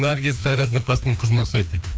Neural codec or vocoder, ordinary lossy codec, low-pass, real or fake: codec, 16 kHz, 2 kbps, FreqCodec, larger model; none; none; fake